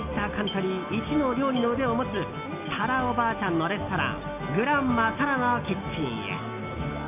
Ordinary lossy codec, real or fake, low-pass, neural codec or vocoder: none; real; 3.6 kHz; none